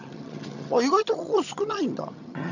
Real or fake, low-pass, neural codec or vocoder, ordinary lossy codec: fake; 7.2 kHz; vocoder, 22.05 kHz, 80 mel bands, HiFi-GAN; none